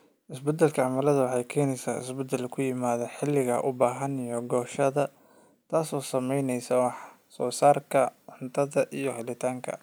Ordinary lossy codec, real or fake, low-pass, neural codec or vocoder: none; real; none; none